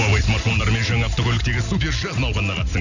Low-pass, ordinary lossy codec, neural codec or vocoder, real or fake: 7.2 kHz; none; none; real